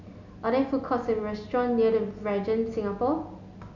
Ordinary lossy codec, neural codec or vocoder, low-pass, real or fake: none; none; 7.2 kHz; real